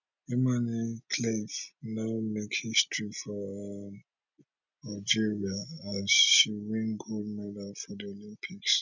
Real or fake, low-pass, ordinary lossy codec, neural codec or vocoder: real; 7.2 kHz; none; none